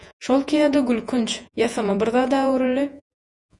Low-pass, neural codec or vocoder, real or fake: 10.8 kHz; vocoder, 48 kHz, 128 mel bands, Vocos; fake